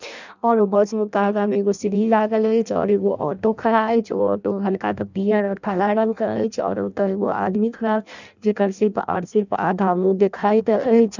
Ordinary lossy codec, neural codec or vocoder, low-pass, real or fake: none; codec, 16 kHz in and 24 kHz out, 0.6 kbps, FireRedTTS-2 codec; 7.2 kHz; fake